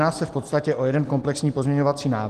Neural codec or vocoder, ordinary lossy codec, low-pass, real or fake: none; Opus, 16 kbps; 14.4 kHz; real